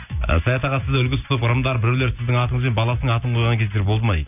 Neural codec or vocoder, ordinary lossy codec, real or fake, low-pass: none; none; real; 3.6 kHz